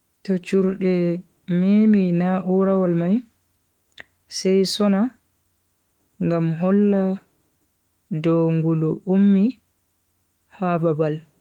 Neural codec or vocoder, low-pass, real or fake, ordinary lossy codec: autoencoder, 48 kHz, 32 numbers a frame, DAC-VAE, trained on Japanese speech; 19.8 kHz; fake; Opus, 24 kbps